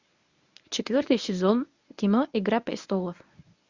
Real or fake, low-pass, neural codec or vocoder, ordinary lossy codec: fake; 7.2 kHz; codec, 24 kHz, 0.9 kbps, WavTokenizer, medium speech release version 1; Opus, 64 kbps